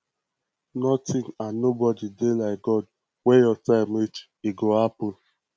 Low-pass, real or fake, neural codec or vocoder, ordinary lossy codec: none; real; none; none